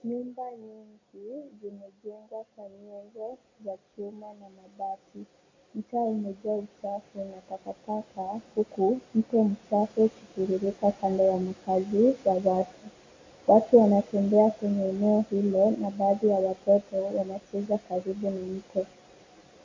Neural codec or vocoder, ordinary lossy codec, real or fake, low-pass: none; AAC, 32 kbps; real; 7.2 kHz